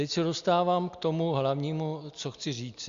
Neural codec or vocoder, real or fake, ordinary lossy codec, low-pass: none; real; Opus, 64 kbps; 7.2 kHz